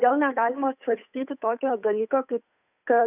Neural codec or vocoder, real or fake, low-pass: codec, 16 kHz, 8 kbps, FunCodec, trained on Chinese and English, 25 frames a second; fake; 3.6 kHz